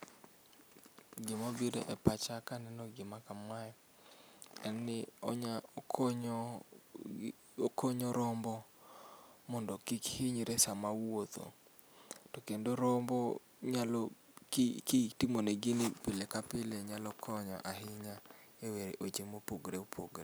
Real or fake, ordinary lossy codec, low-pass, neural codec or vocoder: real; none; none; none